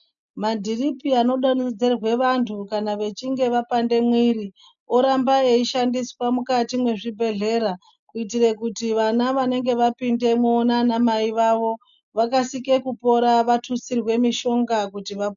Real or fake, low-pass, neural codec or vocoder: real; 7.2 kHz; none